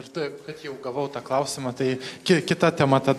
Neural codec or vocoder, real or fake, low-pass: none; real; 14.4 kHz